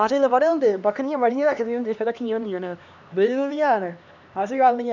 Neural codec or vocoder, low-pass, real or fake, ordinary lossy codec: codec, 16 kHz, 2 kbps, X-Codec, HuBERT features, trained on LibriSpeech; 7.2 kHz; fake; none